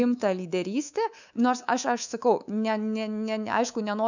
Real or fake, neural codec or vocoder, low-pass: fake; codec, 24 kHz, 3.1 kbps, DualCodec; 7.2 kHz